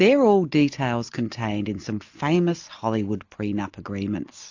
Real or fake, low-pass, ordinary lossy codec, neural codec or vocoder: real; 7.2 kHz; AAC, 48 kbps; none